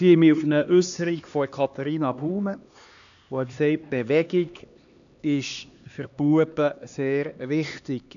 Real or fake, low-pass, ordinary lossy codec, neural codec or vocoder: fake; 7.2 kHz; none; codec, 16 kHz, 2 kbps, X-Codec, HuBERT features, trained on LibriSpeech